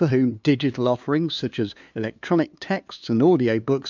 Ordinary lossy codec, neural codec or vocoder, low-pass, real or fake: MP3, 64 kbps; codec, 16 kHz, 2 kbps, FunCodec, trained on LibriTTS, 25 frames a second; 7.2 kHz; fake